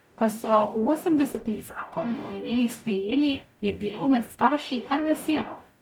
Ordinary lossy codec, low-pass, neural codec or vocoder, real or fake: none; 19.8 kHz; codec, 44.1 kHz, 0.9 kbps, DAC; fake